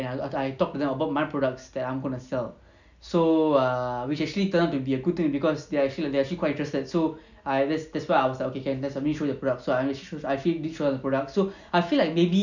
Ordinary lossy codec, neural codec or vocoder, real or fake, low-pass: none; none; real; 7.2 kHz